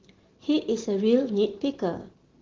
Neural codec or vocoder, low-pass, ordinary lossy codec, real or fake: none; 7.2 kHz; Opus, 16 kbps; real